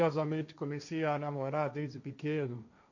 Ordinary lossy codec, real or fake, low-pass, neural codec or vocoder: none; fake; 7.2 kHz; codec, 16 kHz, 1.1 kbps, Voila-Tokenizer